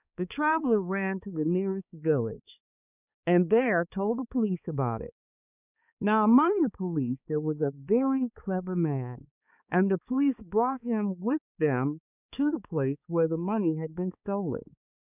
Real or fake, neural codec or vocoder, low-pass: fake; codec, 16 kHz, 4 kbps, X-Codec, HuBERT features, trained on balanced general audio; 3.6 kHz